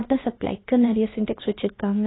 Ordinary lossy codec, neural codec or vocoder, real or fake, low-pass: AAC, 16 kbps; codec, 16 kHz, about 1 kbps, DyCAST, with the encoder's durations; fake; 7.2 kHz